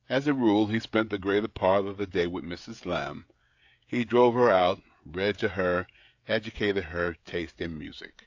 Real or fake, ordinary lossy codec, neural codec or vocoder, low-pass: fake; AAC, 48 kbps; codec, 16 kHz, 16 kbps, FreqCodec, smaller model; 7.2 kHz